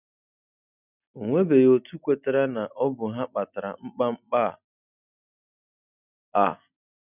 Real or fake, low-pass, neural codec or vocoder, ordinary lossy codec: real; 3.6 kHz; none; none